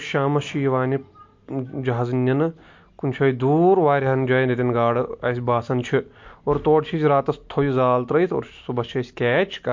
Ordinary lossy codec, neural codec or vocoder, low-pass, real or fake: MP3, 48 kbps; none; 7.2 kHz; real